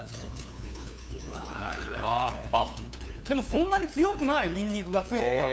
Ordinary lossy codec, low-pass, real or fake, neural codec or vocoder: none; none; fake; codec, 16 kHz, 2 kbps, FunCodec, trained on LibriTTS, 25 frames a second